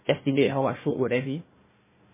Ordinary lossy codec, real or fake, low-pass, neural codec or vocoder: MP3, 16 kbps; fake; 3.6 kHz; codec, 16 kHz, 1 kbps, FunCodec, trained on Chinese and English, 50 frames a second